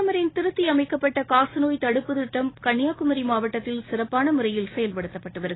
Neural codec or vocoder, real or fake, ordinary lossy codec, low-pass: none; real; AAC, 16 kbps; 7.2 kHz